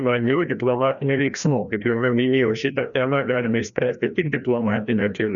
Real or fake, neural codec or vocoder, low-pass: fake; codec, 16 kHz, 1 kbps, FreqCodec, larger model; 7.2 kHz